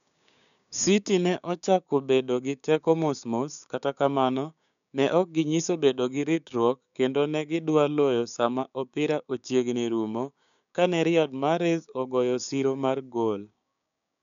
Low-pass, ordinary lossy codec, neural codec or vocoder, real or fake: 7.2 kHz; none; codec, 16 kHz, 6 kbps, DAC; fake